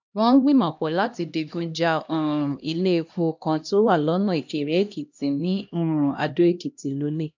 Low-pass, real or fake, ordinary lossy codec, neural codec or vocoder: 7.2 kHz; fake; MP3, 64 kbps; codec, 16 kHz, 1 kbps, X-Codec, HuBERT features, trained on LibriSpeech